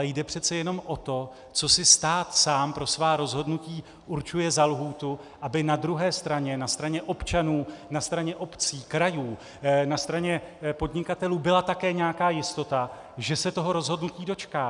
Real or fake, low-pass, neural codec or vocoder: real; 10.8 kHz; none